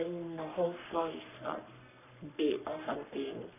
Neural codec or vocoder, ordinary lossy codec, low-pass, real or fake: codec, 44.1 kHz, 3.4 kbps, Pupu-Codec; none; 3.6 kHz; fake